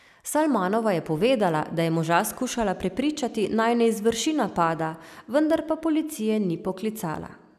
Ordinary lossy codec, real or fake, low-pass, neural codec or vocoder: none; real; 14.4 kHz; none